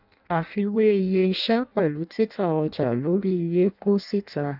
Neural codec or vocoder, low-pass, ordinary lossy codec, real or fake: codec, 16 kHz in and 24 kHz out, 0.6 kbps, FireRedTTS-2 codec; 5.4 kHz; none; fake